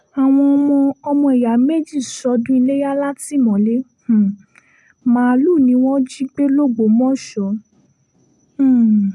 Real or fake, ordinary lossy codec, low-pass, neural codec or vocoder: real; none; none; none